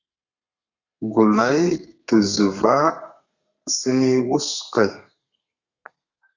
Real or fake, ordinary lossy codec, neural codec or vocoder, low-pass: fake; Opus, 64 kbps; codec, 32 kHz, 1.9 kbps, SNAC; 7.2 kHz